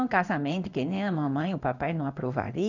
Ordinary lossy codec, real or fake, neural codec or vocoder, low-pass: none; fake; codec, 16 kHz in and 24 kHz out, 1 kbps, XY-Tokenizer; 7.2 kHz